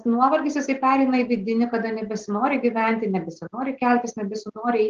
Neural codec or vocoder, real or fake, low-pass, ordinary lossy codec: none; real; 7.2 kHz; Opus, 16 kbps